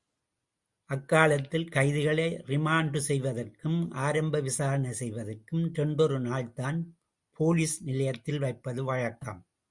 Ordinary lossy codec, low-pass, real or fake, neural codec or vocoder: Opus, 64 kbps; 10.8 kHz; real; none